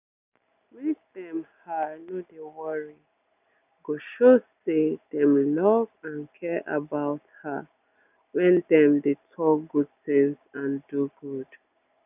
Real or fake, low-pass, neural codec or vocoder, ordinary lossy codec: real; 3.6 kHz; none; none